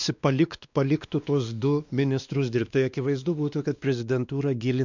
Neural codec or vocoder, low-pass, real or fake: codec, 16 kHz, 2 kbps, X-Codec, WavLM features, trained on Multilingual LibriSpeech; 7.2 kHz; fake